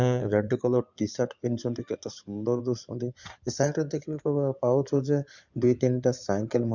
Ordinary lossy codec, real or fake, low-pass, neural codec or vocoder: none; fake; 7.2 kHz; codec, 16 kHz in and 24 kHz out, 2.2 kbps, FireRedTTS-2 codec